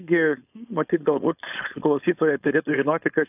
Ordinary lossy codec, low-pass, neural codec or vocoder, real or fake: AAC, 32 kbps; 3.6 kHz; codec, 16 kHz, 4.8 kbps, FACodec; fake